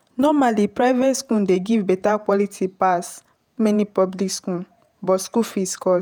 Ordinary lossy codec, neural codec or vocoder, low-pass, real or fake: none; vocoder, 48 kHz, 128 mel bands, Vocos; none; fake